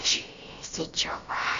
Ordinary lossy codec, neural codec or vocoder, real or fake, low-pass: AAC, 48 kbps; codec, 16 kHz, about 1 kbps, DyCAST, with the encoder's durations; fake; 7.2 kHz